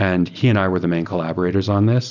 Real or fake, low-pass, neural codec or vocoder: real; 7.2 kHz; none